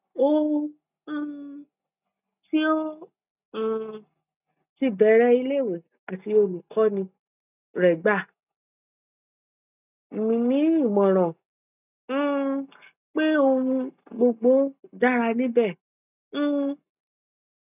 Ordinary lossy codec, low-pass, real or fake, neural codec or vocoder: none; 3.6 kHz; real; none